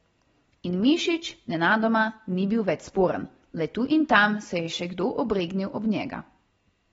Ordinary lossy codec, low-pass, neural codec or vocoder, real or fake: AAC, 24 kbps; 10.8 kHz; none; real